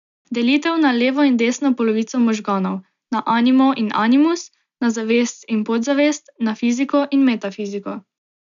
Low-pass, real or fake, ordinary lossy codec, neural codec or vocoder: 7.2 kHz; real; none; none